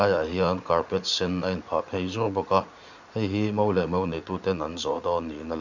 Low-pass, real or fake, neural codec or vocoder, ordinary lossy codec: 7.2 kHz; fake; vocoder, 44.1 kHz, 80 mel bands, Vocos; none